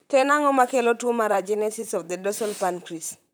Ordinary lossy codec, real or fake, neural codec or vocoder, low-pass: none; fake; vocoder, 44.1 kHz, 128 mel bands, Pupu-Vocoder; none